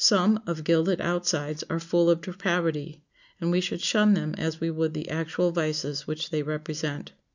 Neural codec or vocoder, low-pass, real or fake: none; 7.2 kHz; real